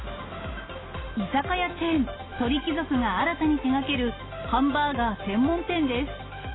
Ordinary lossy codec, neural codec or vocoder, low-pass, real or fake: AAC, 16 kbps; none; 7.2 kHz; real